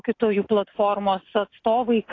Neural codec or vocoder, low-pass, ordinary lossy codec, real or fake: none; 7.2 kHz; AAC, 32 kbps; real